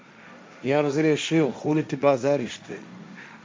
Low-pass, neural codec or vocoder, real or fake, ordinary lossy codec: none; codec, 16 kHz, 1.1 kbps, Voila-Tokenizer; fake; none